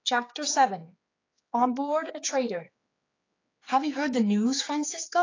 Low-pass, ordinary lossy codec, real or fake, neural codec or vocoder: 7.2 kHz; AAC, 32 kbps; fake; codec, 16 kHz, 4 kbps, X-Codec, HuBERT features, trained on general audio